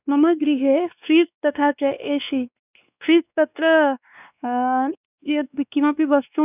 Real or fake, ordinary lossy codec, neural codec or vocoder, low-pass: fake; none; codec, 16 kHz, 2 kbps, X-Codec, WavLM features, trained on Multilingual LibriSpeech; 3.6 kHz